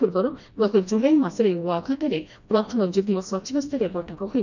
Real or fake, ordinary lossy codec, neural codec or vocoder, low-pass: fake; none; codec, 16 kHz, 1 kbps, FreqCodec, smaller model; 7.2 kHz